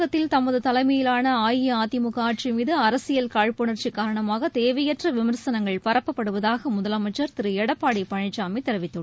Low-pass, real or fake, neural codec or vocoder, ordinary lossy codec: none; real; none; none